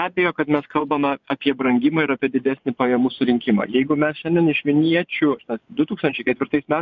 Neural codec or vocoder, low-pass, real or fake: vocoder, 24 kHz, 100 mel bands, Vocos; 7.2 kHz; fake